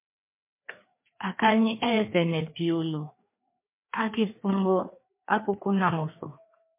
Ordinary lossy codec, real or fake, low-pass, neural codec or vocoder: MP3, 24 kbps; fake; 3.6 kHz; codec, 16 kHz, 2 kbps, FreqCodec, larger model